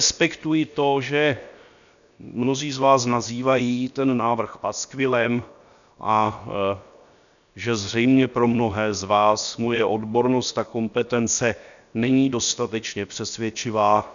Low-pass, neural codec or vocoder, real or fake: 7.2 kHz; codec, 16 kHz, 0.7 kbps, FocalCodec; fake